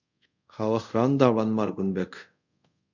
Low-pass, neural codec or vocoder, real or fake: 7.2 kHz; codec, 24 kHz, 0.5 kbps, DualCodec; fake